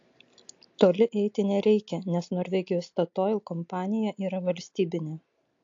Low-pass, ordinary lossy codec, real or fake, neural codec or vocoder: 7.2 kHz; AAC, 48 kbps; real; none